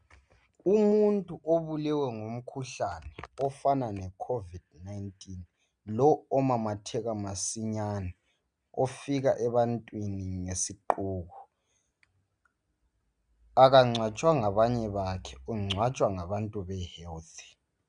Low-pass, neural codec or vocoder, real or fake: 9.9 kHz; none; real